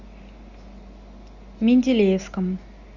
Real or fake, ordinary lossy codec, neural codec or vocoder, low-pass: real; Opus, 64 kbps; none; 7.2 kHz